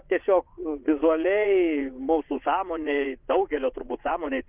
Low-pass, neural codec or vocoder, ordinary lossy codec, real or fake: 3.6 kHz; vocoder, 22.05 kHz, 80 mel bands, Vocos; Opus, 24 kbps; fake